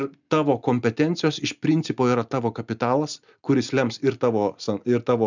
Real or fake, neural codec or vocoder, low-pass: real; none; 7.2 kHz